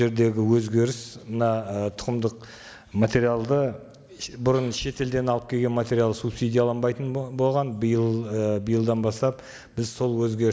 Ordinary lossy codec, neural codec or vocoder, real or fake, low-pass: none; none; real; none